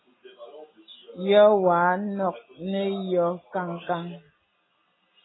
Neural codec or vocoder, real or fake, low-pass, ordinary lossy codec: none; real; 7.2 kHz; AAC, 16 kbps